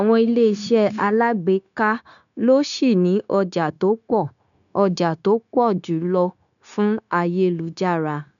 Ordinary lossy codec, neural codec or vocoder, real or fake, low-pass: none; codec, 16 kHz, 0.9 kbps, LongCat-Audio-Codec; fake; 7.2 kHz